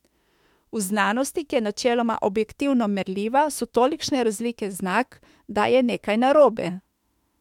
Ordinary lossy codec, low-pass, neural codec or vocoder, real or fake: MP3, 96 kbps; 19.8 kHz; autoencoder, 48 kHz, 32 numbers a frame, DAC-VAE, trained on Japanese speech; fake